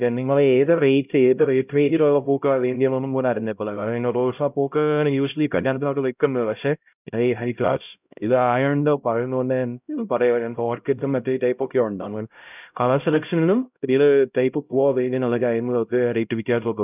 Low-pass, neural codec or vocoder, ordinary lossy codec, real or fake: 3.6 kHz; codec, 16 kHz, 0.5 kbps, X-Codec, HuBERT features, trained on LibriSpeech; none; fake